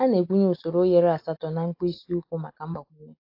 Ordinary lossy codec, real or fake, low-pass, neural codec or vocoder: AAC, 32 kbps; real; 5.4 kHz; none